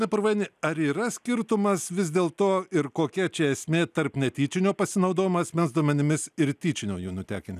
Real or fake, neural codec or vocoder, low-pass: real; none; 14.4 kHz